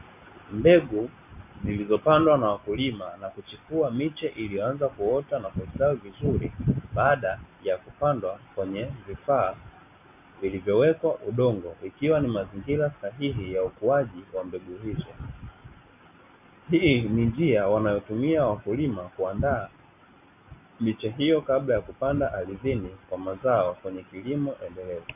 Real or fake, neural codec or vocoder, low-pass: real; none; 3.6 kHz